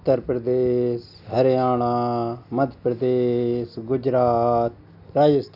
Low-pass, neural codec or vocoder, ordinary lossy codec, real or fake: 5.4 kHz; none; none; real